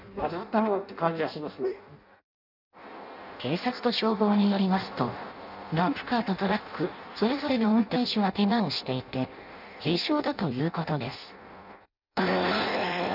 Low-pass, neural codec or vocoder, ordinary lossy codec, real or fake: 5.4 kHz; codec, 16 kHz in and 24 kHz out, 0.6 kbps, FireRedTTS-2 codec; none; fake